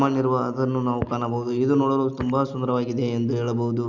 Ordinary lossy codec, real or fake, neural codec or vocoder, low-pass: Opus, 64 kbps; real; none; 7.2 kHz